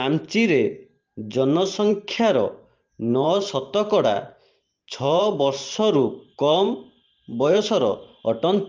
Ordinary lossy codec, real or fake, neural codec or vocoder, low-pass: Opus, 32 kbps; fake; vocoder, 44.1 kHz, 128 mel bands every 512 samples, BigVGAN v2; 7.2 kHz